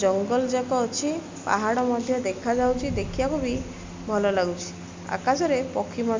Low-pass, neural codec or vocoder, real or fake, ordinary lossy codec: 7.2 kHz; none; real; MP3, 64 kbps